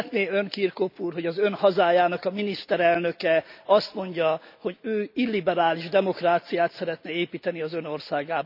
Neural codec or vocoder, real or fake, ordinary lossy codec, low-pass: none; real; none; 5.4 kHz